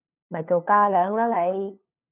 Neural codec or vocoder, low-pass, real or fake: codec, 16 kHz, 2 kbps, FunCodec, trained on LibriTTS, 25 frames a second; 3.6 kHz; fake